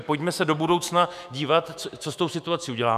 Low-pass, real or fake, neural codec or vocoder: 14.4 kHz; fake; autoencoder, 48 kHz, 128 numbers a frame, DAC-VAE, trained on Japanese speech